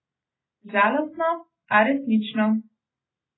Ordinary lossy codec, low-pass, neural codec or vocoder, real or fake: AAC, 16 kbps; 7.2 kHz; none; real